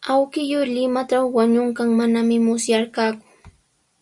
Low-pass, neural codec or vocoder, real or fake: 10.8 kHz; none; real